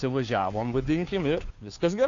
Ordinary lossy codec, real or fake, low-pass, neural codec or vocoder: AAC, 48 kbps; fake; 7.2 kHz; codec, 16 kHz, 2 kbps, FunCodec, trained on Chinese and English, 25 frames a second